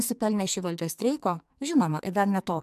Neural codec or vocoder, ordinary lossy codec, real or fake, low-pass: codec, 44.1 kHz, 2.6 kbps, SNAC; MP3, 96 kbps; fake; 14.4 kHz